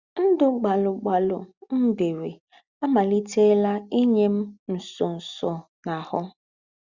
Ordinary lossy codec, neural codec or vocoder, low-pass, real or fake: Opus, 64 kbps; none; 7.2 kHz; real